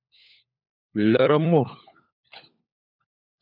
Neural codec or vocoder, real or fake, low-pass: codec, 16 kHz, 4 kbps, FunCodec, trained on LibriTTS, 50 frames a second; fake; 5.4 kHz